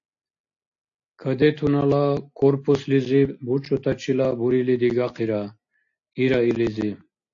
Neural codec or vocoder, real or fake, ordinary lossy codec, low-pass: none; real; AAC, 48 kbps; 7.2 kHz